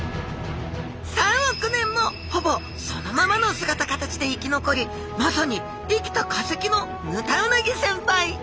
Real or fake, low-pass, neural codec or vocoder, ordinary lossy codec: real; none; none; none